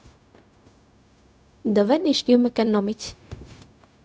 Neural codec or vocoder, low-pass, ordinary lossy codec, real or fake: codec, 16 kHz, 0.4 kbps, LongCat-Audio-Codec; none; none; fake